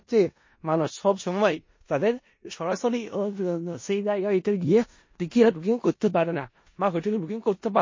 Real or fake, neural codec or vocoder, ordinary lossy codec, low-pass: fake; codec, 16 kHz in and 24 kHz out, 0.4 kbps, LongCat-Audio-Codec, four codebook decoder; MP3, 32 kbps; 7.2 kHz